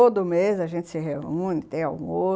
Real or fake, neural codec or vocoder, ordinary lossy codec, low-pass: real; none; none; none